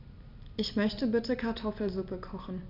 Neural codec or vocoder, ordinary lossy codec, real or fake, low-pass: none; none; real; 5.4 kHz